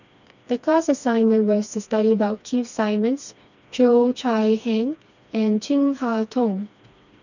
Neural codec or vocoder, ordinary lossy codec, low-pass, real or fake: codec, 16 kHz, 2 kbps, FreqCodec, smaller model; none; 7.2 kHz; fake